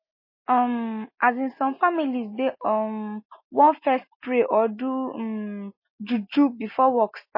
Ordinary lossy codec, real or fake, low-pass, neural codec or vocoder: MP3, 24 kbps; real; 5.4 kHz; none